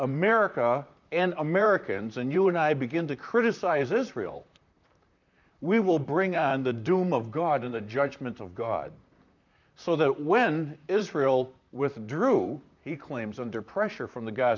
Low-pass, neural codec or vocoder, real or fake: 7.2 kHz; vocoder, 44.1 kHz, 128 mel bands, Pupu-Vocoder; fake